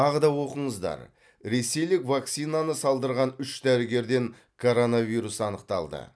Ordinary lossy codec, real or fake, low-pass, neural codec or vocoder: none; real; none; none